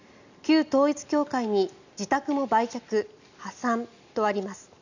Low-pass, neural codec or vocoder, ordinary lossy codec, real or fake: 7.2 kHz; none; none; real